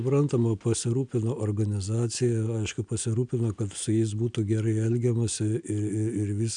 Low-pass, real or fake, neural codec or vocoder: 9.9 kHz; real; none